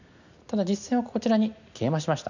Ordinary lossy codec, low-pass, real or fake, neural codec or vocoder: none; 7.2 kHz; real; none